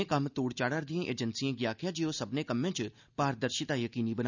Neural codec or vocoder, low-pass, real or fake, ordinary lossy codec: none; 7.2 kHz; real; none